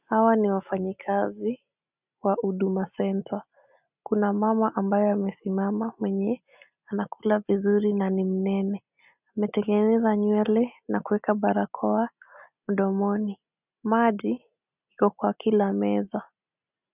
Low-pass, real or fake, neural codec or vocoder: 3.6 kHz; real; none